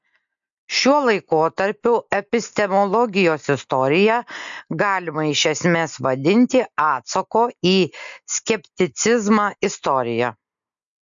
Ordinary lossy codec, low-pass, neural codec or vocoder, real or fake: MP3, 64 kbps; 7.2 kHz; none; real